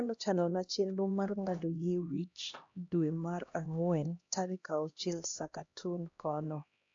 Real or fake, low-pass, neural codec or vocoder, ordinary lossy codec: fake; 7.2 kHz; codec, 16 kHz, 1 kbps, X-Codec, HuBERT features, trained on LibriSpeech; AAC, 48 kbps